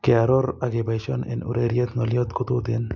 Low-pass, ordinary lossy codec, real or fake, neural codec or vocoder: 7.2 kHz; MP3, 64 kbps; real; none